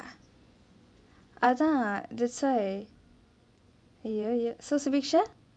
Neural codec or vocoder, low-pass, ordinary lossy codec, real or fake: vocoder, 24 kHz, 100 mel bands, Vocos; 9.9 kHz; none; fake